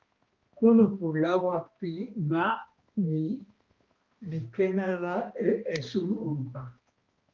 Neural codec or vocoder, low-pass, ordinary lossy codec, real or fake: codec, 16 kHz, 1 kbps, X-Codec, HuBERT features, trained on balanced general audio; 7.2 kHz; Opus, 32 kbps; fake